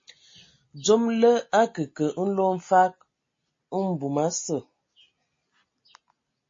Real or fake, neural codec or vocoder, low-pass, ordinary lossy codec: real; none; 7.2 kHz; MP3, 32 kbps